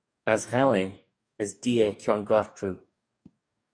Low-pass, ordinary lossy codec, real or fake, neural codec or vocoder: 9.9 kHz; MP3, 96 kbps; fake; codec, 44.1 kHz, 2.6 kbps, DAC